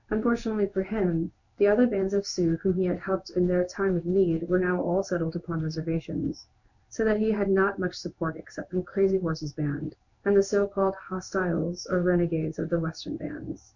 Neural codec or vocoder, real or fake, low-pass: codec, 16 kHz in and 24 kHz out, 1 kbps, XY-Tokenizer; fake; 7.2 kHz